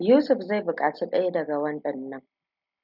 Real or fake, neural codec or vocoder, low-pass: real; none; 5.4 kHz